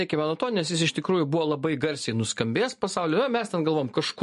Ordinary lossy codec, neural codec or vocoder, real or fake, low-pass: MP3, 48 kbps; none; real; 14.4 kHz